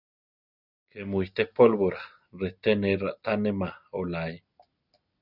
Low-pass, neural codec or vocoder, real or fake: 5.4 kHz; none; real